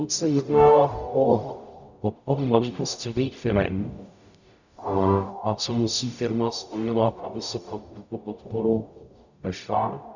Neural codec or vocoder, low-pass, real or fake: codec, 44.1 kHz, 0.9 kbps, DAC; 7.2 kHz; fake